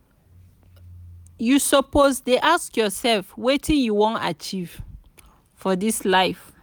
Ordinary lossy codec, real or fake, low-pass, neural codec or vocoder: none; real; none; none